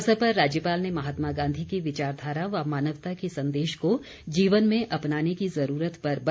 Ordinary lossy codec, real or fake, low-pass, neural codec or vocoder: none; real; none; none